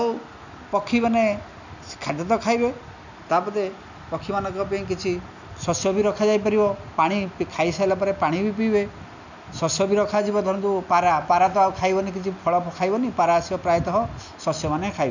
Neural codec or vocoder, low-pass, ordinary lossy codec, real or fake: none; 7.2 kHz; none; real